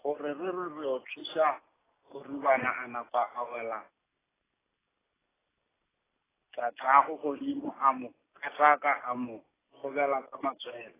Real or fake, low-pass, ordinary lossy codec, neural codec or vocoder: real; 3.6 kHz; AAC, 16 kbps; none